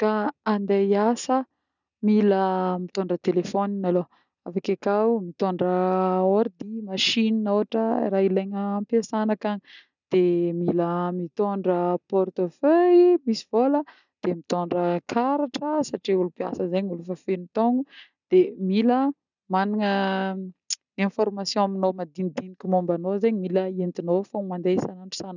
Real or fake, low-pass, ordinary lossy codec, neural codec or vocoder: real; 7.2 kHz; none; none